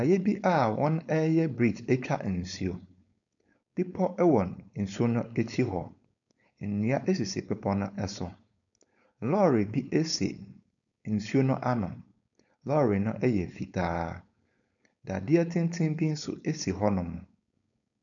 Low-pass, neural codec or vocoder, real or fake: 7.2 kHz; codec, 16 kHz, 4.8 kbps, FACodec; fake